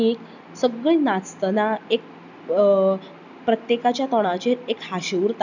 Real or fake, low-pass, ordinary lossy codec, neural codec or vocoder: real; 7.2 kHz; none; none